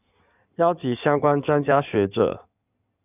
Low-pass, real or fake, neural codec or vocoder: 3.6 kHz; fake; codec, 16 kHz in and 24 kHz out, 2.2 kbps, FireRedTTS-2 codec